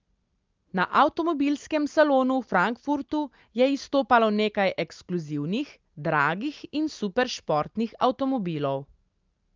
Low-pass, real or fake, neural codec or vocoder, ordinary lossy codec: 7.2 kHz; real; none; Opus, 24 kbps